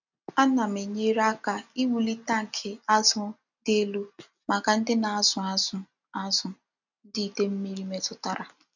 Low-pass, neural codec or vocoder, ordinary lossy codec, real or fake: 7.2 kHz; none; none; real